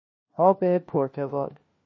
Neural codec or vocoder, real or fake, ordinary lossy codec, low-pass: codec, 16 kHz, 1 kbps, FunCodec, trained on LibriTTS, 50 frames a second; fake; MP3, 32 kbps; 7.2 kHz